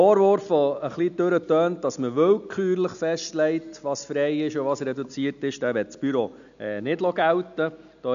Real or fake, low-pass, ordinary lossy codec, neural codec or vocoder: real; 7.2 kHz; none; none